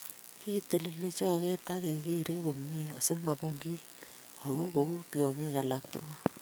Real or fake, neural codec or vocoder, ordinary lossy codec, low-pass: fake; codec, 44.1 kHz, 2.6 kbps, SNAC; none; none